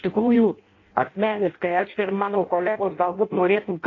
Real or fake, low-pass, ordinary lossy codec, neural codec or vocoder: fake; 7.2 kHz; AAC, 32 kbps; codec, 16 kHz in and 24 kHz out, 0.6 kbps, FireRedTTS-2 codec